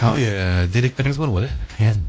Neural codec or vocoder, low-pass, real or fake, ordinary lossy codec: codec, 16 kHz, 1 kbps, X-Codec, WavLM features, trained on Multilingual LibriSpeech; none; fake; none